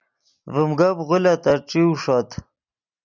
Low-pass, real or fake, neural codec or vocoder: 7.2 kHz; real; none